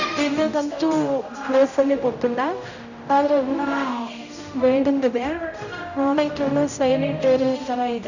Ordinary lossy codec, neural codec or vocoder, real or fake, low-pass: none; codec, 16 kHz, 0.5 kbps, X-Codec, HuBERT features, trained on general audio; fake; 7.2 kHz